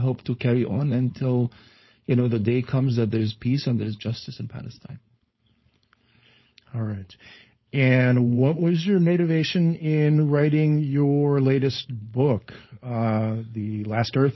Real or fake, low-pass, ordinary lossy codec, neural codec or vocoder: fake; 7.2 kHz; MP3, 24 kbps; codec, 16 kHz, 4.8 kbps, FACodec